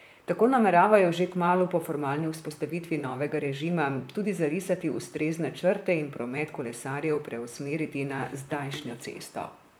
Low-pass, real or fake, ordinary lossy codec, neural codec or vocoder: none; fake; none; vocoder, 44.1 kHz, 128 mel bands, Pupu-Vocoder